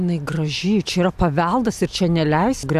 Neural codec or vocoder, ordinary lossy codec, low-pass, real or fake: none; AAC, 96 kbps; 14.4 kHz; real